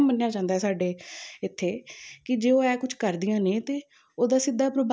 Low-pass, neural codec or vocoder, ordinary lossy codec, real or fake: none; none; none; real